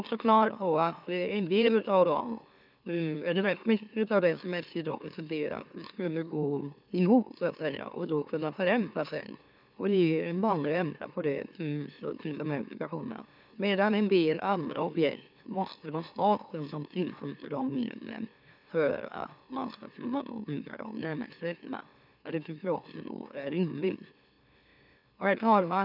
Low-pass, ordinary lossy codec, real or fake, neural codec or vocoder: 5.4 kHz; none; fake; autoencoder, 44.1 kHz, a latent of 192 numbers a frame, MeloTTS